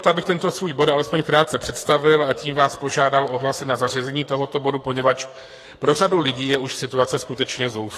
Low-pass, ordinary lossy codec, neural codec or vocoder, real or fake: 14.4 kHz; AAC, 48 kbps; codec, 44.1 kHz, 2.6 kbps, SNAC; fake